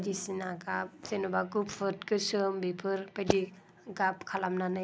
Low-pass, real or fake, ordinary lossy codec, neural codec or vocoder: none; real; none; none